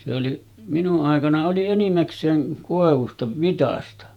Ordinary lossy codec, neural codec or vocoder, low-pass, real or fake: none; vocoder, 48 kHz, 128 mel bands, Vocos; 19.8 kHz; fake